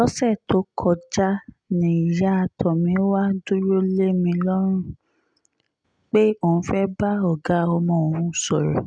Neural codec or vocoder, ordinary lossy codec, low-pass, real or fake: none; none; 9.9 kHz; real